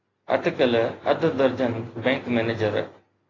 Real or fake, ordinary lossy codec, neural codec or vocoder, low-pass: real; AAC, 32 kbps; none; 7.2 kHz